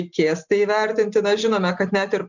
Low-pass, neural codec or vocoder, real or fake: 7.2 kHz; none; real